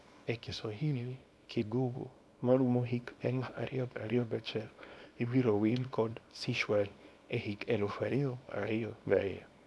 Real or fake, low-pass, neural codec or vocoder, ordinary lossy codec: fake; none; codec, 24 kHz, 0.9 kbps, WavTokenizer, small release; none